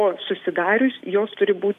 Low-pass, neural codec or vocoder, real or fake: 14.4 kHz; none; real